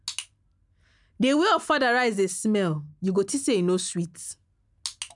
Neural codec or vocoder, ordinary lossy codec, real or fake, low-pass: none; none; real; 10.8 kHz